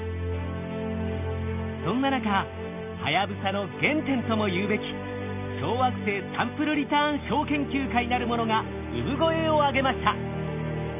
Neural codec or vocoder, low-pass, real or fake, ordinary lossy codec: none; 3.6 kHz; real; none